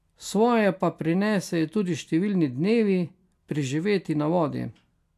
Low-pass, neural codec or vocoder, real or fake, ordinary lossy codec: 14.4 kHz; none; real; AAC, 96 kbps